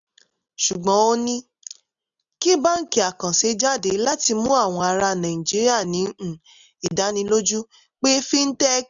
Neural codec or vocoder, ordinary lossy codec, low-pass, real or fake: none; none; 7.2 kHz; real